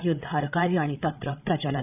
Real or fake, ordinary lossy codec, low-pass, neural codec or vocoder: fake; none; 3.6 kHz; codec, 16 kHz, 16 kbps, FreqCodec, larger model